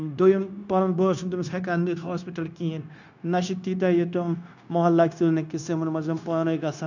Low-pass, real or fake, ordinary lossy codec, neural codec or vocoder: 7.2 kHz; fake; none; codec, 16 kHz, 0.9 kbps, LongCat-Audio-Codec